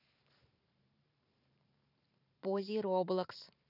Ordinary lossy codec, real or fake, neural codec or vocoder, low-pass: none; fake; vocoder, 44.1 kHz, 128 mel bands every 512 samples, BigVGAN v2; 5.4 kHz